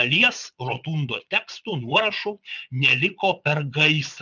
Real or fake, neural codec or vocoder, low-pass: fake; vocoder, 22.05 kHz, 80 mel bands, Vocos; 7.2 kHz